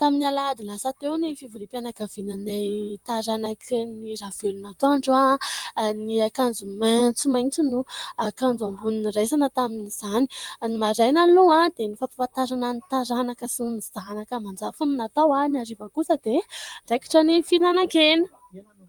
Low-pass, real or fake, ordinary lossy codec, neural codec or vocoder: 19.8 kHz; fake; Opus, 32 kbps; vocoder, 44.1 kHz, 128 mel bands, Pupu-Vocoder